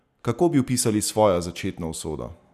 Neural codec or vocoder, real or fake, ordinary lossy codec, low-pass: none; real; none; 14.4 kHz